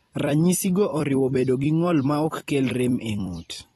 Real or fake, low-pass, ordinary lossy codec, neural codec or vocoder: fake; 19.8 kHz; AAC, 32 kbps; vocoder, 44.1 kHz, 128 mel bands every 256 samples, BigVGAN v2